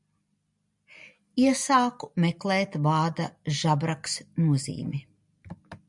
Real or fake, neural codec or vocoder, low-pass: real; none; 10.8 kHz